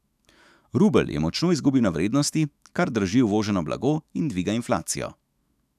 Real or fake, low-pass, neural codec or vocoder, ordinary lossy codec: fake; 14.4 kHz; autoencoder, 48 kHz, 128 numbers a frame, DAC-VAE, trained on Japanese speech; none